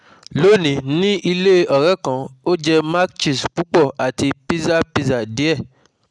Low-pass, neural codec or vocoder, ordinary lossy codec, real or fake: 9.9 kHz; none; none; real